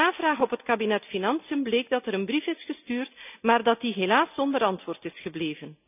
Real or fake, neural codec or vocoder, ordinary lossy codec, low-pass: real; none; none; 3.6 kHz